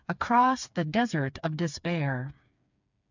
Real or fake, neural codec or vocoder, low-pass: fake; codec, 16 kHz, 4 kbps, FreqCodec, smaller model; 7.2 kHz